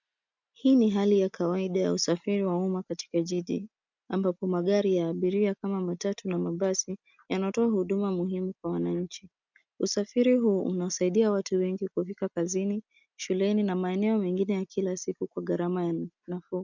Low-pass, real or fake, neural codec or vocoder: 7.2 kHz; real; none